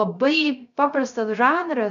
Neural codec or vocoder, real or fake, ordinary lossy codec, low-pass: codec, 16 kHz, 0.3 kbps, FocalCodec; fake; MP3, 96 kbps; 7.2 kHz